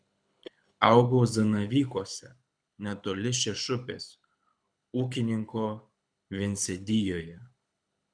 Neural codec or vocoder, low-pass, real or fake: codec, 24 kHz, 6 kbps, HILCodec; 9.9 kHz; fake